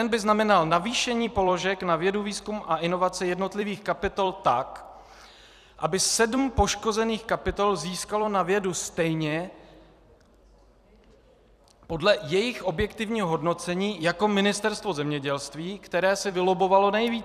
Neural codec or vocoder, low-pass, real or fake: vocoder, 44.1 kHz, 128 mel bands every 256 samples, BigVGAN v2; 14.4 kHz; fake